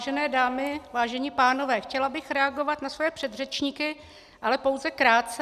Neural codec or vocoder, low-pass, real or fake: none; 14.4 kHz; real